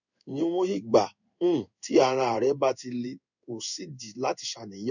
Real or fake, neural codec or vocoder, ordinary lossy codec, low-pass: fake; codec, 16 kHz in and 24 kHz out, 1 kbps, XY-Tokenizer; none; 7.2 kHz